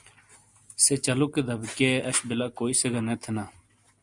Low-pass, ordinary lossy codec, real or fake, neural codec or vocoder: 10.8 kHz; Opus, 64 kbps; real; none